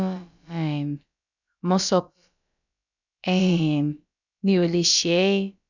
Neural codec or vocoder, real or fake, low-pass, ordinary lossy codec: codec, 16 kHz, about 1 kbps, DyCAST, with the encoder's durations; fake; 7.2 kHz; none